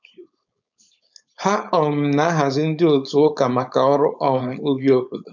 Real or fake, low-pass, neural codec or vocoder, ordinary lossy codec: fake; 7.2 kHz; codec, 16 kHz, 4.8 kbps, FACodec; none